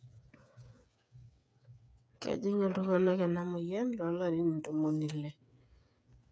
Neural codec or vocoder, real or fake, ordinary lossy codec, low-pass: codec, 16 kHz, 8 kbps, FreqCodec, smaller model; fake; none; none